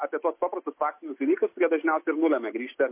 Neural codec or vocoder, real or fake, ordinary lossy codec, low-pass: none; real; MP3, 24 kbps; 3.6 kHz